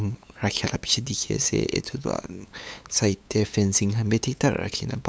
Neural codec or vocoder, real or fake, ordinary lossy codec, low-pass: codec, 16 kHz, 8 kbps, FunCodec, trained on LibriTTS, 25 frames a second; fake; none; none